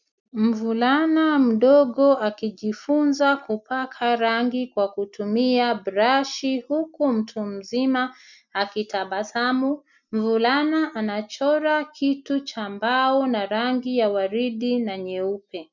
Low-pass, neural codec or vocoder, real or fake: 7.2 kHz; none; real